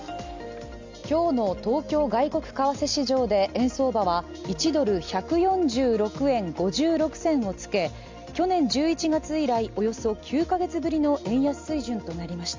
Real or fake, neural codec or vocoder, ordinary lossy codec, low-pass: real; none; MP3, 64 kbps; 7.2 kHz